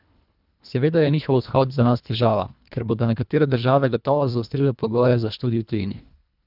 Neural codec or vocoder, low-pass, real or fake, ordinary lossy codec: codec, 24 kHz, 1.5 kbps, HILCodec; 5.4 kHz; fake; none